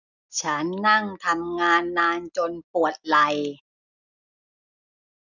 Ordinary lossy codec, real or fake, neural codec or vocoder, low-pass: none; real; none; 7.2 kHz